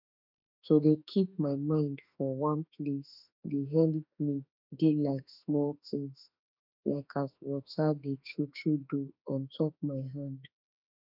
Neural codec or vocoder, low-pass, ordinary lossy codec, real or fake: autoencoder, 48 kHz, 32 numbers a frame, DAC-VAE, trained on Japanese speech; 5.4 kHz; none; fake